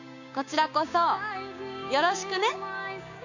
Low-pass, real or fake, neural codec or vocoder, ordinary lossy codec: 7.2 kHz; fake; codec, 16 kHz, 6 kbps, DAC; none